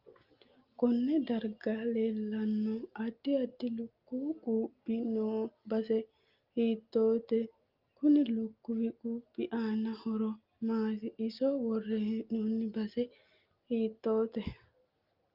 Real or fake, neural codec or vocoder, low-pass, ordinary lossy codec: real; none; 5.4 kHz; Opus, 24 kbps